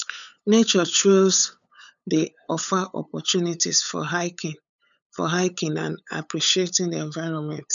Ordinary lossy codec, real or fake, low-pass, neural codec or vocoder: none; fake; 7.2 kHz; codec, 16 kHz, 8 kbps, FunCodec, trained on LibriTTS, 25 frames a second